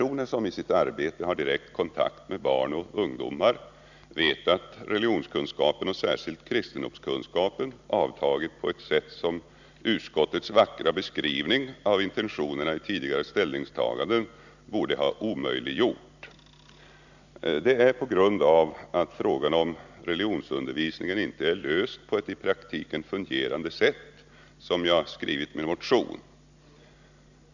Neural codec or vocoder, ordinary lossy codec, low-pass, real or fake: none; none; 7.2 kHz; real